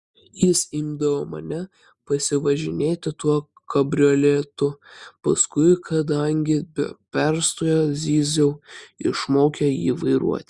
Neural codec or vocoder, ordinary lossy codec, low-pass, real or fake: none; Opus, 64 kbps; 10.8 kHz; real